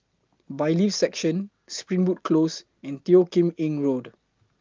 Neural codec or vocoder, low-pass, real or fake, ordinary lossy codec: none; 7.2 kHz; real; Opus, 32 kbps